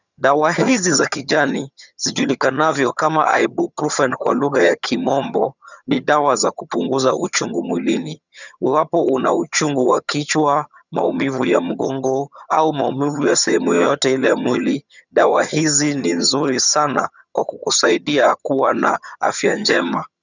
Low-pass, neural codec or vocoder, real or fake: 7.2 kHz; vocoder, 22.05 kHz, 80 mel bands, HiFi-GAN; fake